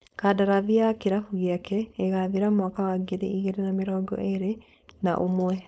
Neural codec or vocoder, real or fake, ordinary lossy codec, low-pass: codec, 16 kHz, 4.8 kbps, FACodec; fake; none; none